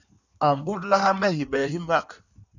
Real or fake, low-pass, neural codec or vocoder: fake; 7.2 kHz; codec, 16 kHz in and 24 kHz out, 1.1 kbps, FireRedTTS-2 codec